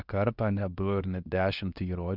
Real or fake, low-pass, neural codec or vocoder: fake; 5.4 kHz; codec, 24 kHz, 0.9 kbps, WavTokenizer, medium speech release version 2